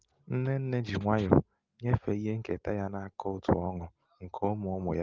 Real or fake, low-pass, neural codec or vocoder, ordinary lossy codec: real; 7.2 kHz; none; Opus, 32 kbps